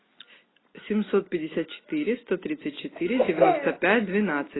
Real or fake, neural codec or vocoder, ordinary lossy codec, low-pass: real; none; AAC, 16 kbps; 7.2 kHz